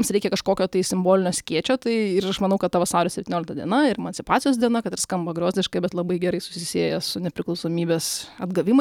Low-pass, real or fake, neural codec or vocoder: 19.8 kHz; real; none